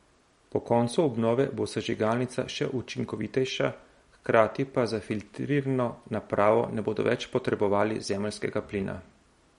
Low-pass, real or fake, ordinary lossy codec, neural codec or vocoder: 19.8 kHz; real; MP3, 48 kbps; none